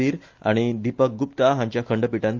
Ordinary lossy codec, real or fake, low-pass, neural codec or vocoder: Opus, 32 kbps; real; 7.2 kHz; none